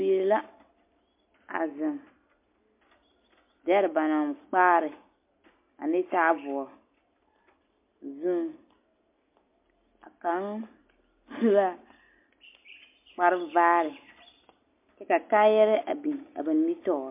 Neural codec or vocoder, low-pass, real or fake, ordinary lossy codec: none; 3.6 kHz; real; MP3, 24 kbps